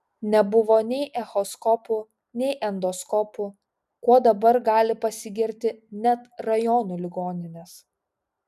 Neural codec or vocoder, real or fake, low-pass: none; real; 14.4 kHz